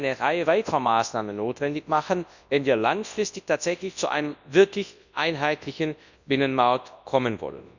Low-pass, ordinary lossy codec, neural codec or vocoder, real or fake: 7.2 kHz; none; codec, 24 kHz, 0.9 kbps, WavTokenizer, large speech release; fake